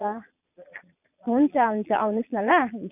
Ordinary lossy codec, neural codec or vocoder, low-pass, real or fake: none; vocoder, 22.05 kHz, 80 mel bands, Vocos; 3.6 kHz; fake